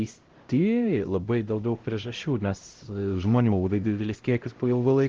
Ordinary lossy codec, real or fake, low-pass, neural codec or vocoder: Opus, 16 kbps; fake; 7.2 kHz; codec, 16 kHz, 0.5 kbps, X-Codec, HuBERT features, trained on LibriSpeech